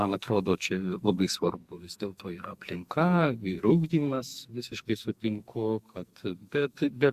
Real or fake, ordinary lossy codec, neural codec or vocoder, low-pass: fake; Opus, 64 kbps; codec, 44.1 kHz, 2.6 kbps, SNAC; 14.4 kHz